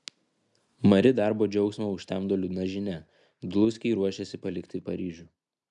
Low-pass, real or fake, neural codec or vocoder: 10.8 kHz; real; none